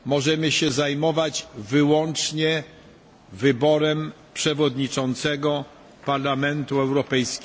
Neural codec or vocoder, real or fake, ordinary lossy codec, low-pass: none; real; none; none